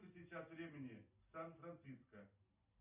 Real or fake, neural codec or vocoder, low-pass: real; none; 3.6 kHz